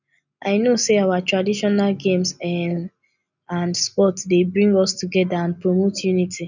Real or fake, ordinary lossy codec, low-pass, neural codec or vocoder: real; none; 7.2 kHz; none